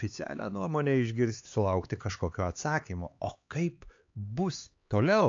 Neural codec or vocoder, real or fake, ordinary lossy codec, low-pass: codec, 16 kHz, 4 kbps, X-Codec, HuBERT features, trained on LibriSpeech; fake; AAC, 48 kbps; 7.2 kHz